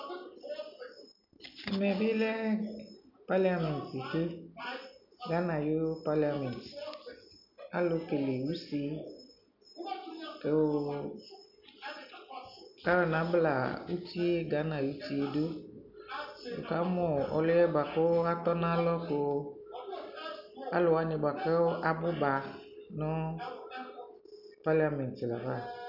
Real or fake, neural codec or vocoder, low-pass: real; none; 5.4 kHz